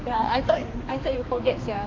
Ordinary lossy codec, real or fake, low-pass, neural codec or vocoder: AAC, 32 kbps; fake; 7.2 kHz; codec, 16 kHz, 2 kbps, X-Codec, HuBERT features, trained on balanced general audio